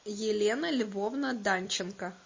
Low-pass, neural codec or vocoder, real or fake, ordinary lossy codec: 7.2 kHz; none; real; MP3, 32 kbps